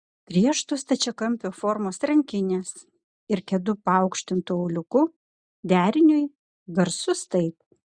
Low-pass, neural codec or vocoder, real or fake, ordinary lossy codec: 9.9 kHz; none; real; Opus, 64 kbps